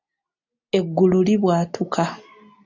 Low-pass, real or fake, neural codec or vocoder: 7.2 kHz; real; none